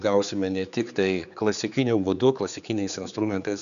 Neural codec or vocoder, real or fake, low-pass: codec, 16 kHz, 4 kbps, X-Codec, HuBERT features, trained on general audio; fake; 7.2 kHz